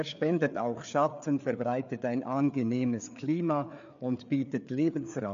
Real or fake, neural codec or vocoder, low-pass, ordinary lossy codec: fake; codec, 16 kHz, 4 kbps, FreqCodec, larger model; 7.2 kHz; MP3, 64 kbps